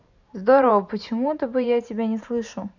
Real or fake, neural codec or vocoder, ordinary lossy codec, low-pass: fake; vocoder, 44.1 kHz, 128 mel bands every 512 samples, BigVGAN v2; none; 7.2 kHz